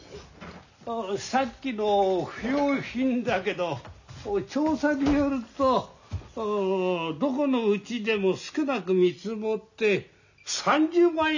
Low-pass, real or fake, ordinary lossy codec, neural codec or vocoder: 7.2 kHz; real; none; none